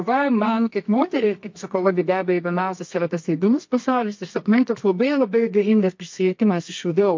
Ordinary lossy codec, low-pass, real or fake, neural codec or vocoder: MP3, 48 kbps; 7.2 kHz; fake; codec, 24 kHz, 0.9 kbps, WavTokenizer, medium music audio release